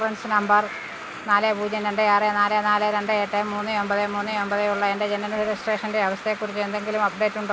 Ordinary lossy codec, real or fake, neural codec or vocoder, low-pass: none; real; none; none